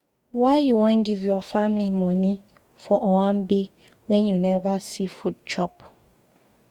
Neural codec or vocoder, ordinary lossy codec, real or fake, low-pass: codec, 44.1 kHz, 2.6 kbps, DAC; Opus, 64 kbps; fake; 19.8 kHz